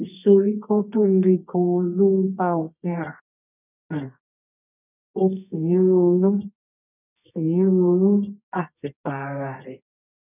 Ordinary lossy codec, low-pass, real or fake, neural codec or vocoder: none; 3.6 kHz; fake; codec, 24 kHz, 0.9 kbps, WavTokenizer, medium music audio release